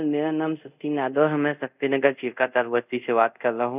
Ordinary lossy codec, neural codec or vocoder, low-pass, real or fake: none; codec, 24 kHz, 0.5 kbps, DualCodec; 3.6 kHz; fake